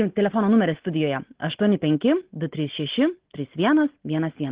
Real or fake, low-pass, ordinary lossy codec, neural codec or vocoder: real; 3.6 kHz; Opus, 16 kbps; none